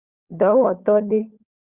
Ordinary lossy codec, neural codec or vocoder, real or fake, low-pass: Opus, 64 kbps; codec, 16 kHz, 16 kbps, FunCodec, trained on LibriTTS, 50 frames a second; fake; 3.6 kHz